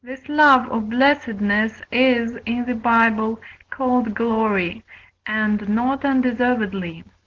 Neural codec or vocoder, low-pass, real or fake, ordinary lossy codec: none; 7.2 kHz; real; Opus, 16 kbps